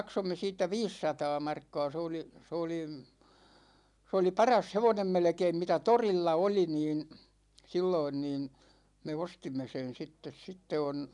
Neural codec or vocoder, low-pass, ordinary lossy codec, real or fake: none; 10.8 kHz; none; real